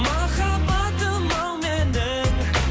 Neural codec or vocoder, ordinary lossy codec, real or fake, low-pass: none; none; real; none